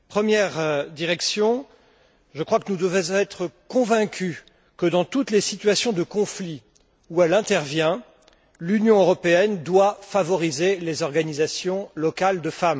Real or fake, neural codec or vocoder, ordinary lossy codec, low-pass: real; none; none; none